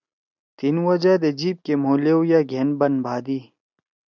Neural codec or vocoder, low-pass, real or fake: none; 7.2 kHz; real